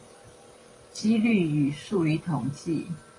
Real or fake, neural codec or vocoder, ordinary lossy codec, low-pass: fake; vocoder, 44.1 kHz, 128 mel bands every 512 samples, BigVGAN v2; AAC, 32 kbps; 10.8 kHz